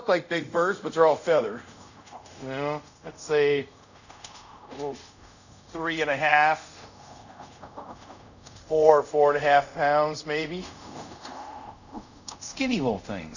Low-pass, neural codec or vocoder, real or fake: 7.2 kHz; codec, 24 kHz, 0.5 kbps, DualCodec; fake